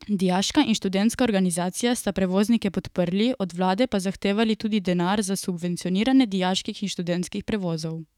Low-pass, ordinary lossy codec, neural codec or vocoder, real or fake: 19.8 kHz; none; autoencoder, 48 kHz, 128 numbers a frame, DAC-VAE, trained on Japanese speech; fake